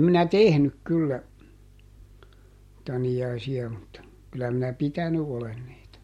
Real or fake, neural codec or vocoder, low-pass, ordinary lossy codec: real; none; 19.8 kHz; MP3, 64 kbps